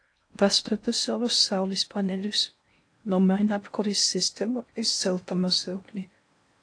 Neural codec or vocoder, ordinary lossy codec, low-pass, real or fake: codec, 16 kHz in and 24 kHz out, 0.6 kbps, FocalCodec, streaming, 2048 codes; AAC, 48 kbps; 9.9 kHz; fake